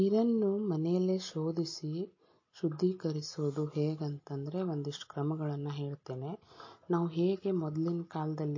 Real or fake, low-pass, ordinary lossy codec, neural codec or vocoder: real; 7.2 kHz; MP3, 32 kbps; none